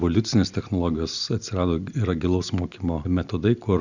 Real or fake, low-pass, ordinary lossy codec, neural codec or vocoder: real; 7.2 kHz; Opus, 64 kbps; none